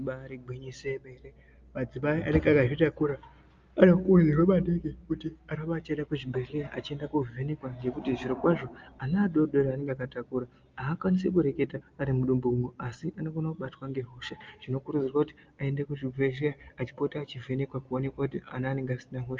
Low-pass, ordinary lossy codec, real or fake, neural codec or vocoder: 7.2 kHz; Opus, 24 kbps; real; none